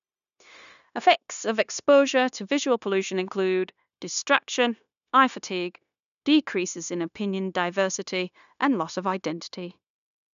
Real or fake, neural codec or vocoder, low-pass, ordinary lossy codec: fake; codec, 16 kHz, 0.9 kbps, LongCat-Audio-Codec; 7.2 kHz; none